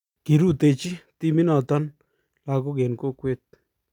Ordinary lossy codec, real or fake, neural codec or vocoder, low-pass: none; real; none; 19.8 kHz